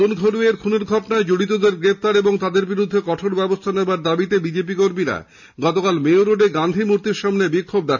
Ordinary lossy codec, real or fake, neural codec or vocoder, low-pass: none; real; none; 7.2 kHz